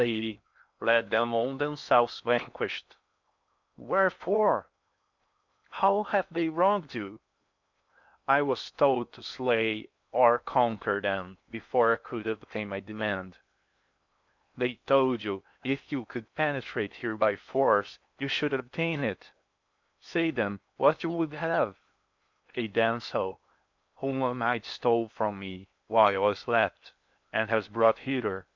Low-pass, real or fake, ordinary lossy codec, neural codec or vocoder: 7.2 kHz; fake; MP3, 64 kbps; codec, 16 kHz in and 24 kHz out, 0.8 kbps, FocalCodec, streaming, 65536 codes